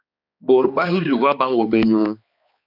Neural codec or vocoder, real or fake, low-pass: codec, 16 kHz, 2 kbps, X-Codec, HuBERT features, trained on balanced general audio; fake; 5.4 kHz